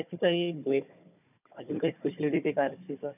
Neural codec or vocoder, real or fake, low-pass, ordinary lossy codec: codec, 16 kHz, 4 kbps, FunCodec, trained on Chinese and English, 50 frames a second; fake; 3.6 kHz; none